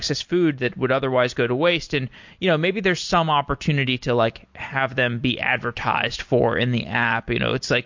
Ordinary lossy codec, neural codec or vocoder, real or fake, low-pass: MP3, 48 kbps; none; real; 7.2 kHz